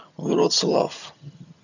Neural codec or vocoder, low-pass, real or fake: vocoder, 22.05 kHz, 80 mel bands, HiFi-GAN; 7.2 kHz; fake